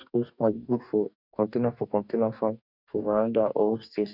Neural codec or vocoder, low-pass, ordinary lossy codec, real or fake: codec, 44.1 kHz, 2.6 kbps, DAC; 5.4 kHz; none; fake